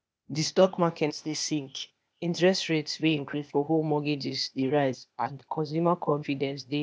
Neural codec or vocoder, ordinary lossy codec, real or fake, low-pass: codec, 16 kHz, 0.8 kbps, ZipCodec; none; fake; none